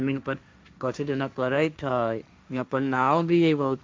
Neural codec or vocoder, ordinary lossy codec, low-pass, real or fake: codec, 16 kHz, 1.1 kbps, Voila-Tokenizer; none; none; fake